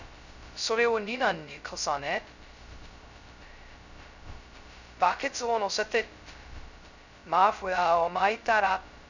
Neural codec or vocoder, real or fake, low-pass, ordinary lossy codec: codec, 16 kHz, 0.2 kbps, FocalCodec; fake; 7.2 kHz; none